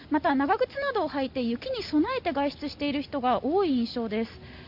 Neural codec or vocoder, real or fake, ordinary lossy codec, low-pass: none; real; none; 5.4 kHz